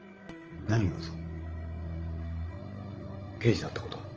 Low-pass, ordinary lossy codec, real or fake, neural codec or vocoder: 7.2 kHz; Opus, 24 kbps; fake; codec, 16 kHz, 16 kbps, FreqCodec, larger model